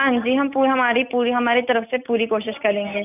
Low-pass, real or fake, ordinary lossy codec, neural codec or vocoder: 3.6 kHz; real; none; none